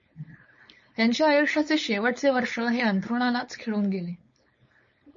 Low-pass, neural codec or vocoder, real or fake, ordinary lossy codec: 7.2 kHz; codec, 16 kHz, 4.8 kbps, FACodec; fake; MP3, 32 kbps